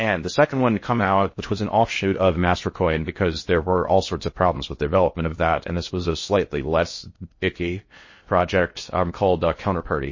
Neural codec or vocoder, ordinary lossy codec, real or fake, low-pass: codec, 16 kHz in and 24 kHz out, 0.6 kbps, FocalCodec, streaming, 4096 codes; MP3, 32 kbps; fake; 7.2 kHz